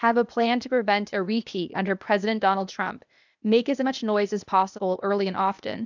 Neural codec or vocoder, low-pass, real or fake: codec, 16 kHz, 0.8 kbps, ZipCodec; 7.2 kHz; fake